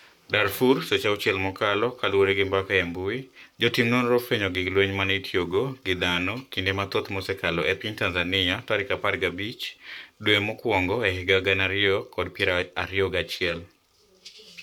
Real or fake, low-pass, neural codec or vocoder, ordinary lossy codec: fake; 19.8 kHz; codec, 44.1 kHz, 7.8 kbps, Pupu-Codec; none